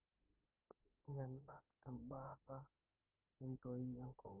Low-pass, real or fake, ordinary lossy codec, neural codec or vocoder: 3.6 kHz; fake; Opus, 32 kbps; autoencoder, 48 kHz, 32 numbers a frame, DAC-VAE, trained on Japanese speech